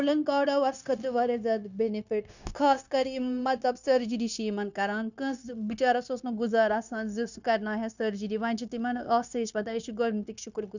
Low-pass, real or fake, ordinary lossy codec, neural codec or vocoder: 7.2 kHz; fake; none; codec, 16 kHz, 0.9 kbps, LongCat-Audio-Codec